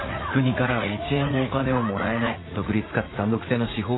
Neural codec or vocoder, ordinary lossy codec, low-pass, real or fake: vocoder, 44.1 kHz, 80 mel bands, Vocos; AAC, 16 kbps; 7.2 kHz; fake